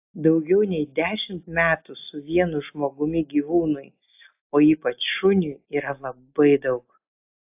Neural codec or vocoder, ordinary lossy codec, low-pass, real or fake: none; AAC, 32 kbps; 3.6 kHz; real